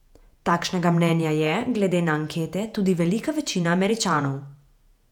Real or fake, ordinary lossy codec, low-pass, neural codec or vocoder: fake; none; 19.8 kHz; vocoder, 48 kHz, 128 mel bands, Vocos